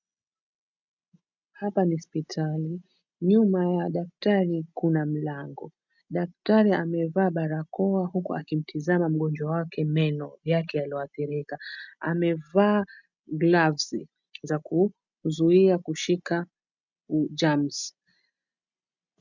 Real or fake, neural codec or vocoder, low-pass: real; none; 7.2 kHz